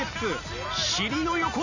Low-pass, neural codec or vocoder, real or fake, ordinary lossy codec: 7.2 kHz; none; real; none